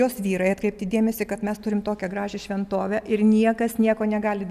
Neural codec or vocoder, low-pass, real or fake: none; 14.4 kHz; real